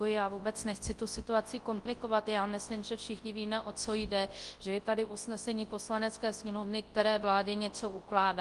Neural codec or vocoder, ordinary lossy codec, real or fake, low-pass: codec, 24 kHz, 0.9 kbps, WavTokenizer, large speech release; Opus, 24 kbps; fake; 10.8 kHz